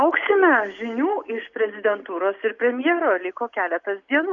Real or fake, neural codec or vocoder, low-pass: real; none; 7.2 kHz